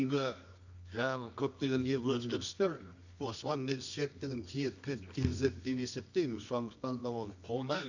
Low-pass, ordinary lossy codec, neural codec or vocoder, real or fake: 7.2 kHz; none; codec, 24 kHz, 0.9 kbps, WavTokenizer, medium music audio release; fake